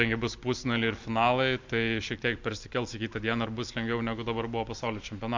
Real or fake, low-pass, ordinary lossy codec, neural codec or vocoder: real; 7.2 kHz; MP3, 64 kbps; none